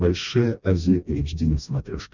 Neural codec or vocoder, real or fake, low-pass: codec, 16 kHz, 1 kbps, FreqCodec, smaller model; fake; 7.2 kHz